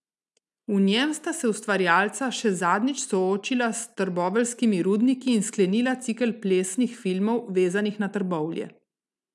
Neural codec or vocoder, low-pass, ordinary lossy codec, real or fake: none; none; none; real